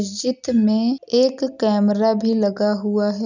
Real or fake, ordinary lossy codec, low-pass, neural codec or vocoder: real; none; 7.2 kHz; none